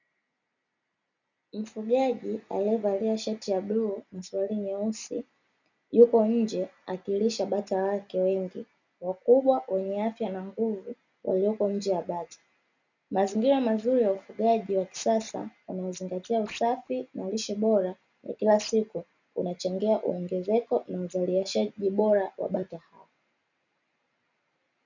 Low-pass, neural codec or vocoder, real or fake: 7.2 kHz; none; real